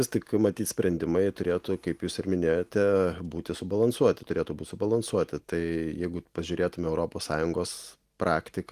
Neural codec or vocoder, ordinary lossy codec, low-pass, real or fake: none; Opus, 24 kbps; 14.4 kHz; real